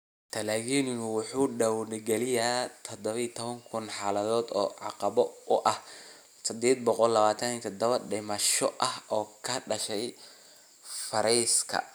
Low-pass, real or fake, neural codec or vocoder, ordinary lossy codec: none; real; none; none